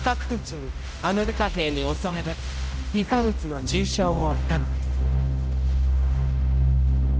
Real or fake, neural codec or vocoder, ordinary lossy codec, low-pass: fake; codec, 16 kHz, 0.5 kbps, X-Codec, HuBERT features, trained on general audio; none; none